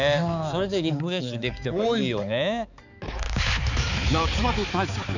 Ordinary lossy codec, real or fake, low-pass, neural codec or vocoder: none; fake; 7.2 kHz; codec, 16 kHz, 4 kbps, X-Codec, HuBERT features, trained on balanced general audio